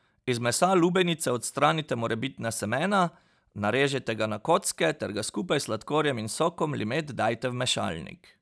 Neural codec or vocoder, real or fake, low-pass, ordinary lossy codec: none; real; none; none